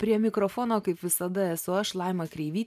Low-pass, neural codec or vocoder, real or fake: 14.4 kHz; vocoder, 44.1 kHz, 128 mel bands every 512 samples, BigVGAN v2; fake